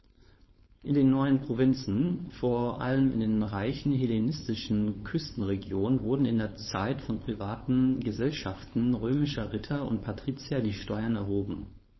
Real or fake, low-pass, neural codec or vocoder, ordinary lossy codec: fake; 7.2 kHz; codec, 16 kHz, 4.8 kbps, FACodec; MP3, 24 kbps